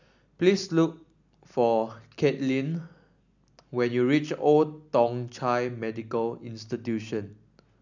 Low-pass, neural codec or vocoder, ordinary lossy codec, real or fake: 7.2 kHz; none; none; real